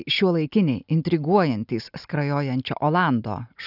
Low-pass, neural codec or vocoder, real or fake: 5.4 kHz; none; real